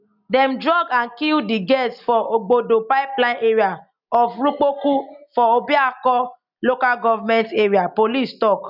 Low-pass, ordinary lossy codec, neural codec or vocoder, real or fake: 5.4 kHz; none; none; real